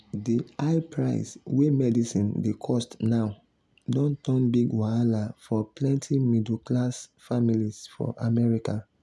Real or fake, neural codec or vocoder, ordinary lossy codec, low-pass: real; none; none; none